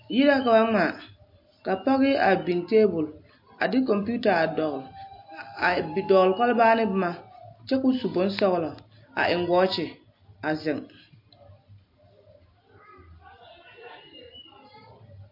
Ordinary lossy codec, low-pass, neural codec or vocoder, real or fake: MP3, 32 kbps; 5.4 kHz; none; real